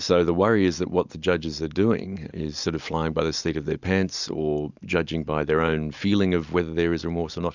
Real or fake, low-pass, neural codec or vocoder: real; 7.2 kHz; none